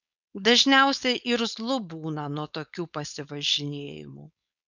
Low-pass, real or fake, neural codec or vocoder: 7.2 kHz; fake; codec, 16 kHz, 4.8 kbps, FACodec